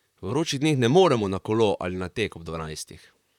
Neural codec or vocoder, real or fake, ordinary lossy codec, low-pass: vocoder, 44.1 kHz, 128 mel bands, Pupu-Vocoder; fake; none; 19.8 kHz